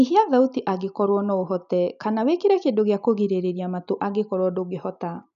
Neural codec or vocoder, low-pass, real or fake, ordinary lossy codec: none; 7.2 kHz; real; none